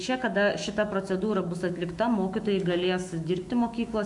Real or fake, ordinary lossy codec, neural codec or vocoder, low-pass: fake; MP3, 64 kbps; autoencoder, 48 kHz, 128 numbers a frame, DAC-VAE, trained on Japanese speech; 10.8 kHz